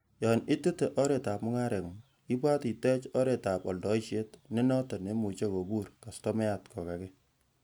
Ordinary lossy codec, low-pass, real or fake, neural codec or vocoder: none; none; real; none